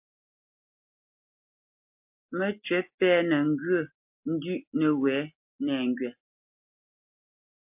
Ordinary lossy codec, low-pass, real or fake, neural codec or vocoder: MP3, 32 kbps; 3.6 kHz; real; none